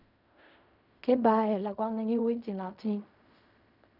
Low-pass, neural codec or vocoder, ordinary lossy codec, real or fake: 5.4 kHz; codec, 16 kHz in and 24 kHz out, 0.4 kbps, LongCat-Audio-Codec, fine tuned four codebook decoder; none; fake